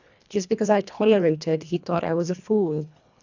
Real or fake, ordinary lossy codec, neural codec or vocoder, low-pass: fake; none; codec, 24 kHz, 1.5 kbps, HILCodec; 7.2 kHz